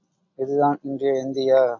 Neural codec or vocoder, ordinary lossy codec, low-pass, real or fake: none; AAC, 48 kbps; 7.2 kHz; real